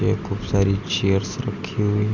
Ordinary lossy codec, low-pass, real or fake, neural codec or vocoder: none; 7.2 kHz; real; none